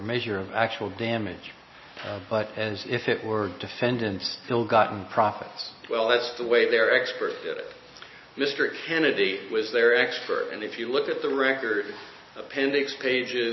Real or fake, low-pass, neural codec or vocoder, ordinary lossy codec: real; 7.2 kHz; none; MP3, 24 kbps